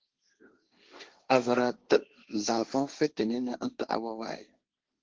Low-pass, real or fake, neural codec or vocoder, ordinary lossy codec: 7.2 kHz; fake; codec, 16 kHz, 1.1 kbps, Voila-Tokenizer; Opus, 16 kbps